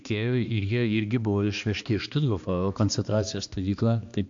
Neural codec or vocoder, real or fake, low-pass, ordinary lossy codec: codec, 16 kHz, 2 kbps, X-Codec, HuBERT features, trained on balanced general audio; fake; 7.2 kHz; AAC, 64 kbps